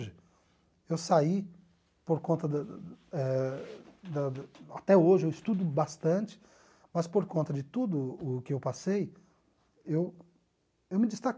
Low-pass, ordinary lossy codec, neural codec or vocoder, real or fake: none; none; none; real